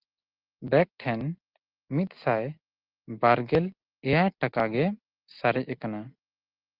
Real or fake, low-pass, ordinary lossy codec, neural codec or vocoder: real; 5.4 kHz; Opus, 24 kbps; none